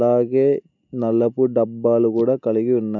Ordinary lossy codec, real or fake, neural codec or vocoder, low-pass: none; real; none; 7.2 kHz